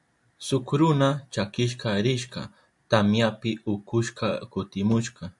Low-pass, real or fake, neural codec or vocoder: 10.8 kHz; real; none